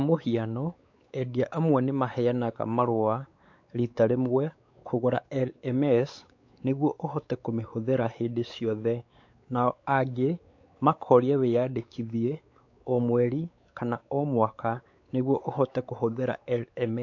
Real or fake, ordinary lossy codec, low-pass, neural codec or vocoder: fake; AAC, 48 kbps; 7.2 kHz; codec, 16 kHz, 4 kbps, X-Codec, WavLM features, trained on Multilingual LibriSpeech